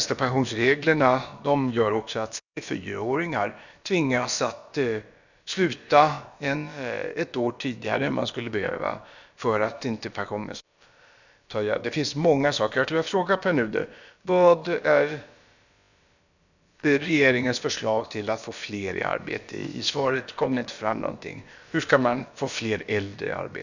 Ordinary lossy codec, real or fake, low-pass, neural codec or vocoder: none; fake; 7.2 kHz; codec, 16 kHz, about 1 kbps, DyCAST, with the encoder's durations